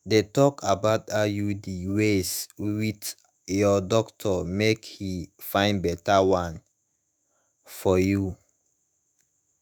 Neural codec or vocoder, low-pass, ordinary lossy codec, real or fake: autoencoder, 48 kHz, 128 numbers a frame, DAC-VAE, trained on Japanese speech; none; none; fake